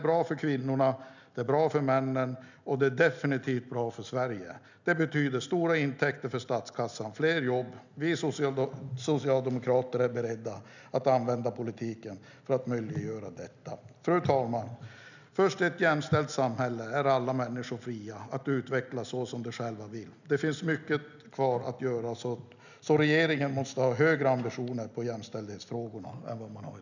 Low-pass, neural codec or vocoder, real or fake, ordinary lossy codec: 7.2 kHz; none; real; none